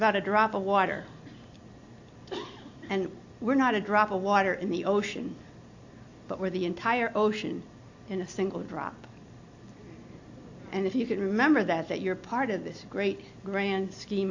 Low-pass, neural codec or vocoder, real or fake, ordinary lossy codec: 7.2 kHz; none; real; AAC, 48 kbps